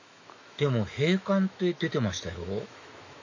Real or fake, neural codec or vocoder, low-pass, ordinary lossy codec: fake; autoencoder, 48 kHz, 128 numbers a frame, DAC-VAE, trained on Japanese speech; 7.2 kHz; AAC, 48 kbps